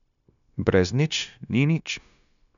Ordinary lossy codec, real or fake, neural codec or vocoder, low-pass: none; fake; codec, 16 kHz, 0.9 kbps, LongCat-Audio-Codec; 7.2 kHz